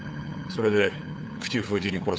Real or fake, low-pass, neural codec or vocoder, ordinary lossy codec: fake; none; codec, 16 kHz, 8 kbps, FunCodec, trained on LibriTTS, 25 frames a second; none